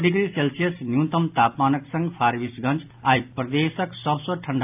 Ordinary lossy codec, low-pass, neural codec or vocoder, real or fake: AAC, 32 kbps; 3.6 kHz; none; real